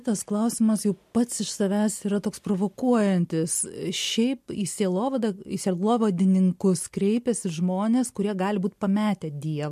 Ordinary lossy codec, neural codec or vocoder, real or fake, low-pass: MP3, 64 kbps; none; real; 14.4 kHz